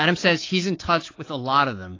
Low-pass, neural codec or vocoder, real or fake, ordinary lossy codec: 7.2 kHz; codec, 24 kHz, 3.1 kbps, DualCodec; fake; AAC, 32 kbps